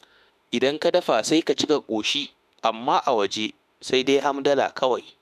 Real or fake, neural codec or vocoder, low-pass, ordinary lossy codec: fake; autoencoder, 48 kHz, 32 numbers a frame, DAC-VAE, trained on Japanese speech; 14.4 kHz; none